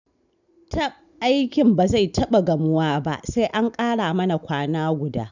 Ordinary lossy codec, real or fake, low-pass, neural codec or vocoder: none; real; 7.2 kHz; none